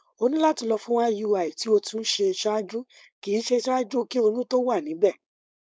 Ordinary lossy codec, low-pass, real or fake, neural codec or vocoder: none; none; fake; codec, 16 kHz, 4.8 kbps, FACodec